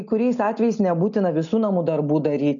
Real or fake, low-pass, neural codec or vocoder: real; 7.2 kHz; none